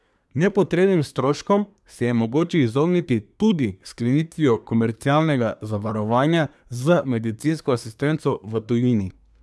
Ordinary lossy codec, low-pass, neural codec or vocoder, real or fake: none; none; codec, 24 kHz, 1 kbps, SNAC; fake